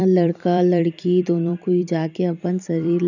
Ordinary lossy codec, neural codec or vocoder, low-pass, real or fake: none; vocoder, 44.1 kHz, 128 mel bands every 256 samples, BigVGAN v2; 7.2 kHz; fake